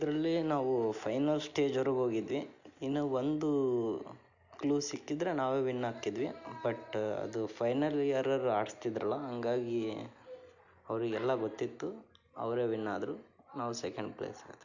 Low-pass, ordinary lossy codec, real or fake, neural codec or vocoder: 7.2 kHz; none; real; none